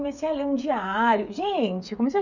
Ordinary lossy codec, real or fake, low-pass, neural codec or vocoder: none; fake; 7.2 kHz; codec, 16 kHz, 8 kbps, FreqCodec, smaller model